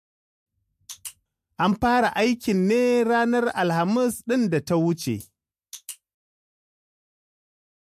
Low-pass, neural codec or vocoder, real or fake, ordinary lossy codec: 14.4 kHz; none; real; MP3, 64 kbps